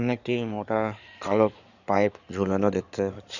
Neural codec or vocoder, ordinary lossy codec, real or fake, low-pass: codec, 16 kHz in and 24 kHz out, 2.2 kbps, FireRedTTS-2 codec; none; fake; 7.2 kHz